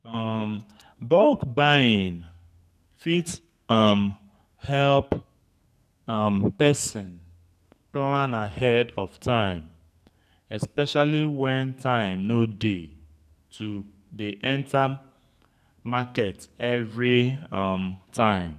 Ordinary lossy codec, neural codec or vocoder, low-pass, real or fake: none; codec, 44.1 kHz, 2.6 kbps, SNAC; 14.4 kHz; fake